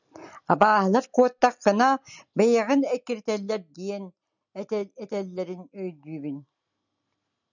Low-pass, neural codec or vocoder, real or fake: 7.2 kHz; none; real